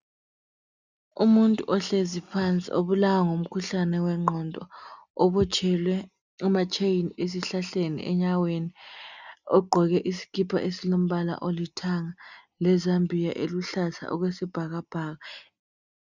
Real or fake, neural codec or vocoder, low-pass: real; none; 7.2 kHz